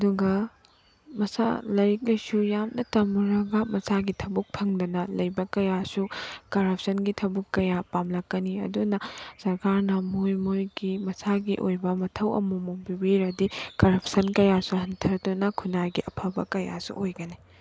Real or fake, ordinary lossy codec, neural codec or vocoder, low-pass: real; none; none; none